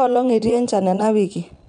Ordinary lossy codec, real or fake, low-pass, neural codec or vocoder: none; fake; 9.9 kHz; vocoder, 22.05 kHz, 80 mel bands, Vocos